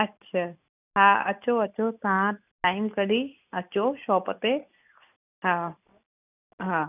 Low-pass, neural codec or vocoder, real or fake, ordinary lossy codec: 3.6 kHz; none; real; none